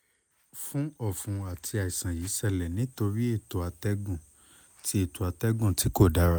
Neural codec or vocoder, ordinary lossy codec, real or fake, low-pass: none; none; real; none